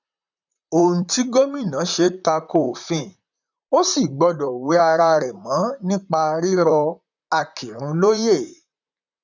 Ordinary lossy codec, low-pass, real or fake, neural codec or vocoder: none; 7.2 kHz; fake; vocoder, 44.1 kHz, 128 mel bands, Pupu-Vocoder